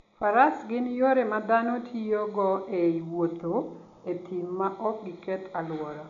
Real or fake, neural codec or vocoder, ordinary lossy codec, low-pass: real; none; none; 7.2 kHz